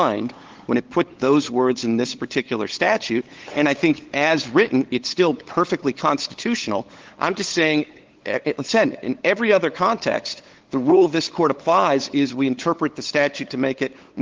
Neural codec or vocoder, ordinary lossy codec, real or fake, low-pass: codec, 16 kHz, 8 kbps, FunCodec, trained on LibriTTS, 25 frames a second; Opus, 16 kbps; fake; 7.2 kHz